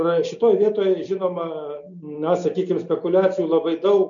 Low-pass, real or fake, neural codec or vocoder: 7.2 kHz; real; none